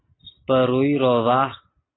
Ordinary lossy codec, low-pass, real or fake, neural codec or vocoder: AAC, 16 kbps; 7.2 kHz; real; none